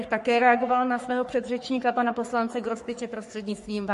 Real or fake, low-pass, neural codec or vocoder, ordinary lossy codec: fake; 14.4 kHz; codec, 44.1 kHz, 3.4 kbps, Pupu-Codec; MP3, 48 kbps